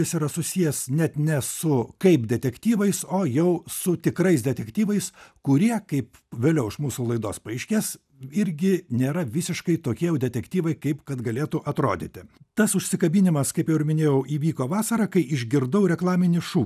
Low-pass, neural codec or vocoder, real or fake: 14.4 kHz; none; real